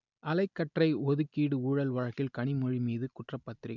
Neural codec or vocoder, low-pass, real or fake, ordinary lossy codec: none; 7.2 kHz; real; none